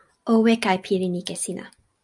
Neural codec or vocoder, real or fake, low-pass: none; real; 10.8 kHz